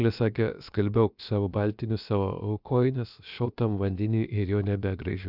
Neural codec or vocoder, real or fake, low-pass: codec, 16 kHz, about 1 kbps, DyCAST, with the encoder's durations; fake; 5.4 kHz